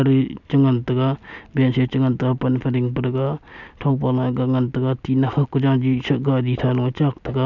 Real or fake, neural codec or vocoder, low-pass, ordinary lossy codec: real; none; 7.2 kHz; none